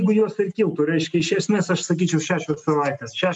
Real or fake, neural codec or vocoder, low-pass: real; none; 10.8 kHz